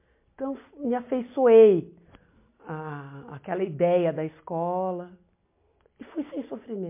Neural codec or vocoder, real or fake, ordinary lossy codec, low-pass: none; real; MP3, 24 kbps; 3.6 kHz